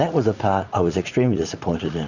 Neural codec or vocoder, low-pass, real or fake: vocoder, 44.1 kHz, 80 mel bands, Vocos; 7.2 kHz; fake